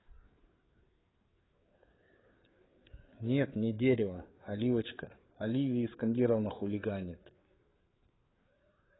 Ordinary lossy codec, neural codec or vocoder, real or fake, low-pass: AAC, 16 kbps; codec, 16 kHz, 4 kbps, FreqCodec, larger model; fake; 7.2 kHz